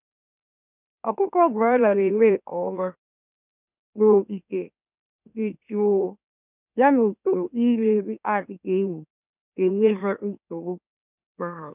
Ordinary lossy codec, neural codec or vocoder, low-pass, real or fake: none; autoencoder, 44.1 kHz, a latent of 192 numbers a frame, MeloTTS; 3.6 kHz; fake